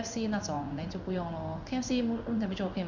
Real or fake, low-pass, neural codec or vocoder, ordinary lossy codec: fake; 7.2 kHz; codec, 16 kHz in and 24 kHz out, 1 kbps, XY-Tokenizer; none